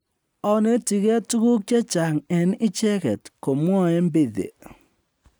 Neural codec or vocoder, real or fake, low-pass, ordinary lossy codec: none; real; none; none